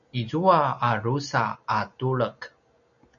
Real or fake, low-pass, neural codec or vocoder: real; 7.2 kHz; none